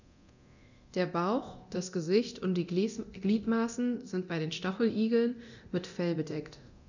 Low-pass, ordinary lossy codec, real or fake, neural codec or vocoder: 7.2 kHz; none; fake; codec, 24 kHz, 0.9 kbps, DualCodec